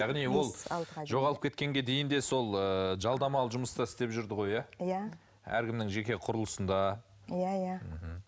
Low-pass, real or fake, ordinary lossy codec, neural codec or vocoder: none; real; none; none